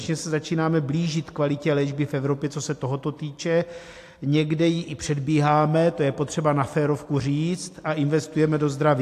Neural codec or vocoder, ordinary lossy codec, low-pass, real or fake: none; AAC, 64 kbps; 14.4 kHz; real